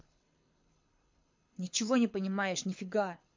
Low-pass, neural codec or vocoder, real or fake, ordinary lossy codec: 7.2 kHz; codec, 24 kHz, 6 kbps, HILCodec; fake; MP3, 48 kbps